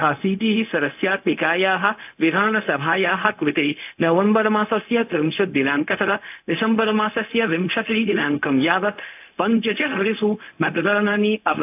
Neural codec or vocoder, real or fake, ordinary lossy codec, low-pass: codec, 16 kHz, 0.4 kbps, LongCat-Audio-Codec; fake; AAC, 32 kbps; 3.6 kHz